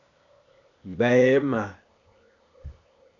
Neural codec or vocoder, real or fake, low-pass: codec, 16 kHz, 0.8 kbps, ZipCodec; fake; 7.2 kHz